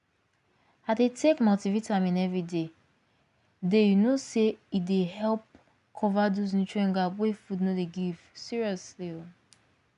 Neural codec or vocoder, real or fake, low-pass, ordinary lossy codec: none; real; 10.8 kHz; none